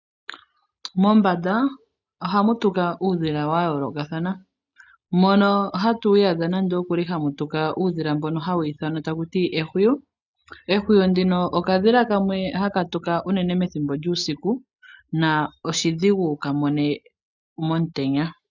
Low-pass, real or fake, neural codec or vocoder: 7.2 kHz; real; none